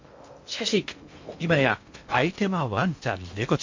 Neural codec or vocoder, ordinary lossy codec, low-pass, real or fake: codec, 16 kHz in and 24 kHz out, 0.6 kbps, FocalCodec, streaming, 2048 codes; MP3, 48 kbps; 7.2 kHz; fake